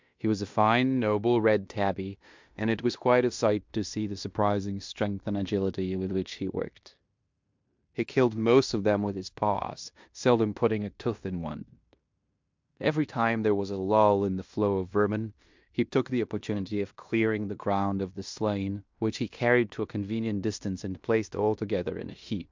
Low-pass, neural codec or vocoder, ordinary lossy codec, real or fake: 7.2 kHz; codec, 16 kHz in and 24 kHz out, 0.9 kbps, LongCat-Audio-Codec, fine tuned four codebook decoder; MP3, 64 kbps; fake